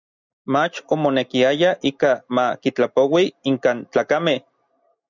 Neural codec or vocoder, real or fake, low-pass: none; real; 7.2 kHz